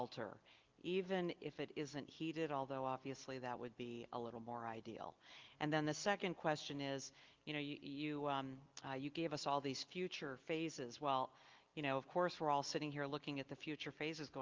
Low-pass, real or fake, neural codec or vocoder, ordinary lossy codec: 7.2 kHz; real; none; Opus, 32 kbps